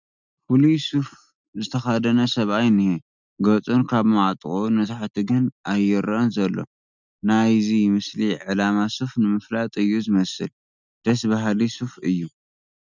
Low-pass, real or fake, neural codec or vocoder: 7.2 kHz; real; none